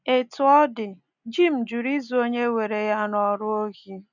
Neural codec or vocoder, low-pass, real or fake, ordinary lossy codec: none; 7.2 kHz; real; none